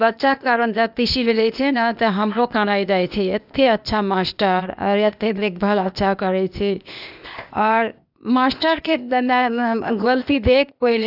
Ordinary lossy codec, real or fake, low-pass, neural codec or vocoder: none; fake; 5.4 kHz; codec, 16 kHz, 0.8 kbps, ZipCodec